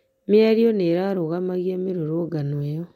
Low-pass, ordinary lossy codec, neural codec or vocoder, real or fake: 19.8 kHz; MP3, 64 kbps; none; real